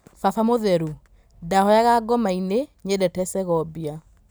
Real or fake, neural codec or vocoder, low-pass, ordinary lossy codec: real; none; none; none